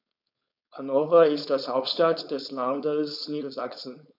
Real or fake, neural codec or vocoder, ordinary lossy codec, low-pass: fake; codec, 16 kHz, 4.8 kbps, FACodec; none; 5.4 kHz